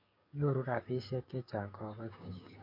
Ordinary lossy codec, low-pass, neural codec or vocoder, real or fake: MP3, 32 kbps; 5.4 kHz; codec, 16 kHz, 6 kbps, DAC; fake